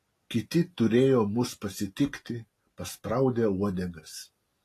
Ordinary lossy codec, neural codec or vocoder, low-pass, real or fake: AAC, 48 kbps; none; 14.4 kHz; real